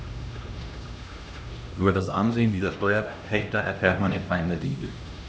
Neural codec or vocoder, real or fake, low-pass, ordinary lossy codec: codec, 16 kHz, 1 kbps, X-Codec, HuBERT features, trained on LibriSpeech; fake; none; none